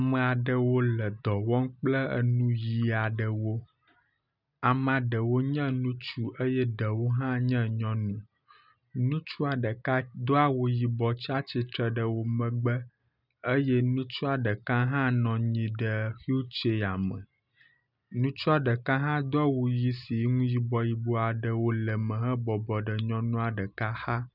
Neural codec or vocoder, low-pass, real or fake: none; 5.4 kHz; real